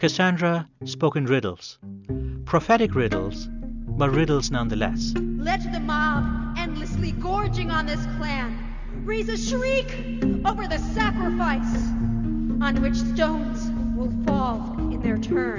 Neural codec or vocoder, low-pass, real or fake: none; 7.2 kHz; real